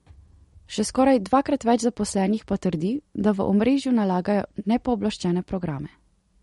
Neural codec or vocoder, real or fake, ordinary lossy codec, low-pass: none; real; MP3, 48 kbps; 19.8 kHz